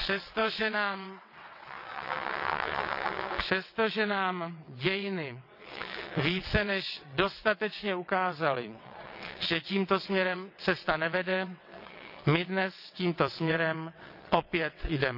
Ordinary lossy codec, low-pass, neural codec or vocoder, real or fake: none; 5.4 kHz; vocoder, 22.05 kHz, 80 mel bands, WaveNeXt; fake